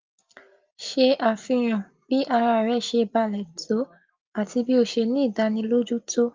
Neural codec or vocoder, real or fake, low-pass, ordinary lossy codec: none; real; 7.2 kHz; Opus, 24 kbps